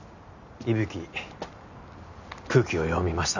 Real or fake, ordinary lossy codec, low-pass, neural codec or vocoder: real; none; 7.2 kHz; none